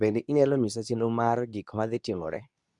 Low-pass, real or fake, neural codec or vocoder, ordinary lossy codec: 10.8 kHz; fake; codec, 24 kHz, 0.9 kbps, WavTokenizer, medium speech release version 2; none